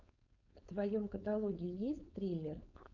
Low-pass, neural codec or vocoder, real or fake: 7.2 kHz; codec, 16 kHz, 4.8 kbps, FACodec; fake